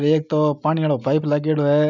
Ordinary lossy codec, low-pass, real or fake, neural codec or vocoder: none; 7.2 kHz; real; none